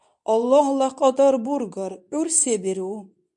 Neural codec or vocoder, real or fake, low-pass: codec, 24 kHz, 0.9 kbps, WavTokenizer, medium speech release version 2; fake; 10.8 kHz